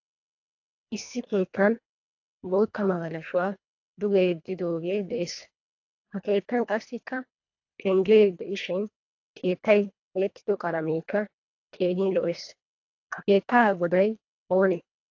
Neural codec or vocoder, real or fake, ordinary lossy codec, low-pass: codec, 24 kHz, 1.5 kbps, HILCodec; fake; AAC, 48 kbps; 7.2 kHz